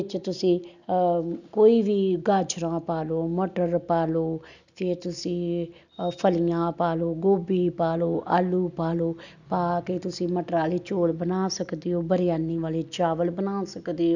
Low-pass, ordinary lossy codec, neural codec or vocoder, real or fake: 7.2 kHz; none; none; real